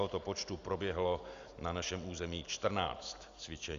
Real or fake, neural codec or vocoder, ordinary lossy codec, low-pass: real; none; Opus, 64 kbps; 7.2 kHz